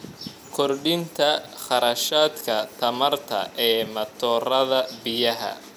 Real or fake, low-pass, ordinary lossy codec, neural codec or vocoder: real; 19.8 kHz; none; none